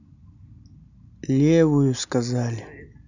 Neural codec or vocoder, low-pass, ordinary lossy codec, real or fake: none; 7.2 kHz; none; real